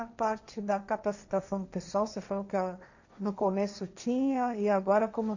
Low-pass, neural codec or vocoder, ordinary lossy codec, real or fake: 7.2 kHz; codec, 16 kHz, 1.1 kbps, Voila-Tokenizer; none; fake